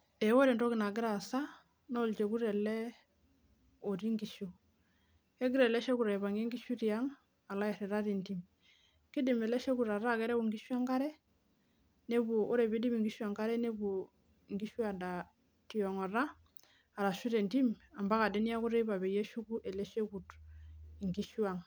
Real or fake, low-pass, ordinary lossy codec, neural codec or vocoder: real; none; none; none